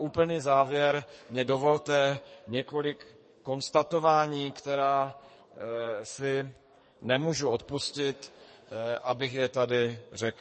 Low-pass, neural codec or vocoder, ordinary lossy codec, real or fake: 10.8 kHz; codec, 44.1 kHz, 2.6 kbps, SNAC; MP3, 32 kbps; fake